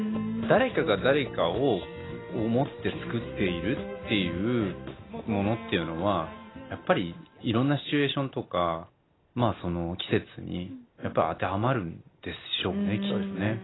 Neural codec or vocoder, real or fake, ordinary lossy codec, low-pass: none; real; AAC, 16 kbps; 7.2 kHz